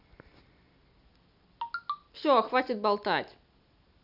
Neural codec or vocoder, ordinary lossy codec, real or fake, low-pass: none; none; real; 5.4 kHz